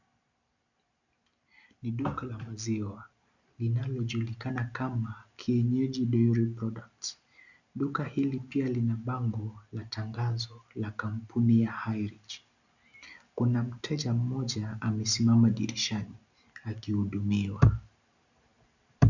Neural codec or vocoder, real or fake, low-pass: none; real; 7.2 kHz